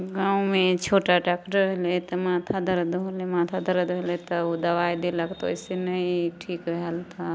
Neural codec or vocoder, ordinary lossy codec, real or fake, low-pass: none; none; real; none